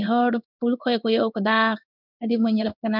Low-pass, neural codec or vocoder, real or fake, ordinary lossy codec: 5.4 kHz; codec, 16 kHz in and 24 kHz out, 1 kbps, XY-Tokenizer; fake; none